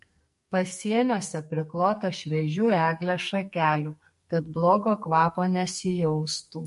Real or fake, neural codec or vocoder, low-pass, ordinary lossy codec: fake; codec, 44.1 kHz, 2.6 kbps, SNAC; 14.4 kHz; MP3, 48 kbps